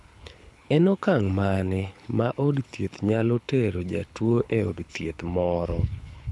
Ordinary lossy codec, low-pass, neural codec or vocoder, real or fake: none; none; codec, 24 kHz, 6 kbps, HILCodec; fake